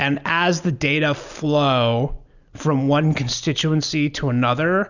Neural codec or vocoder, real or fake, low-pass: none; real; 7.2 kHz